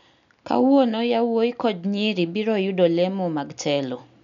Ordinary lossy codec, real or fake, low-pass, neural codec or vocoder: none; real; 7.2 kHz; none